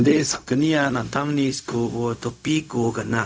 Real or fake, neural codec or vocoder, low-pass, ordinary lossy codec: fake; codec, 16 kHz, 0.4 kbps, LongCat-Audio-Codec; none; none